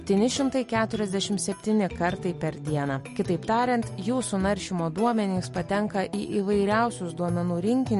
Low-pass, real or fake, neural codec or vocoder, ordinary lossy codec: 14.4 kHz; real; none; MP3, 48 kbps